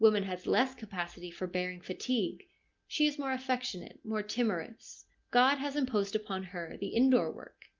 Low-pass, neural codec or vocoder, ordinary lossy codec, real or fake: 7.2 kHz; none; Opus, 24 kbps; real